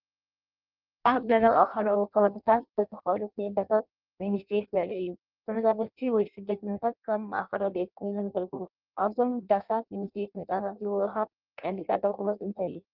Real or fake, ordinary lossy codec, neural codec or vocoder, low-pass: fake; Opus, 32 kbps; codec, 16 kHz in and 24 kHz out, 0.6 kbps, FireRedTTS-2 codec; 5.4 kHz